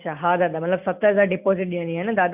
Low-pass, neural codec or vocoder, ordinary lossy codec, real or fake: 3.6 kHz; none; none; real